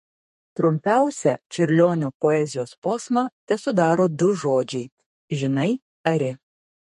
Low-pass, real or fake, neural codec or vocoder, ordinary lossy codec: 14.4 kHz; fake; codec, 32 kHz, 1.9 kbps, SNAC; MP3, 48 kbps